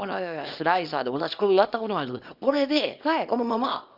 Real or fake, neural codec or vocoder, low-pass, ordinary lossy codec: fake; codec, 24 kHz, 0.9 kbps, WavTokenizer, small release; 5.4 kHz; none